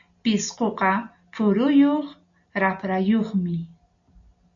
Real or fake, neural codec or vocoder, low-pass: real; none; 7.2 kHz